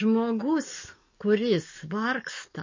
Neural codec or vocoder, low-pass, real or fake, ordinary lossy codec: codec, 44.1 kHz, 7.8 kbps, DAC; 7.2 kHz; fake; MP3, 32 kbps